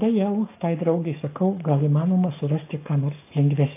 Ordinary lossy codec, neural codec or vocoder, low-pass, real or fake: AAC, 24 kbps; codec, 44.1 kHz, 7.8 kbps, Pupu-Codec; 3.6 kHz; fake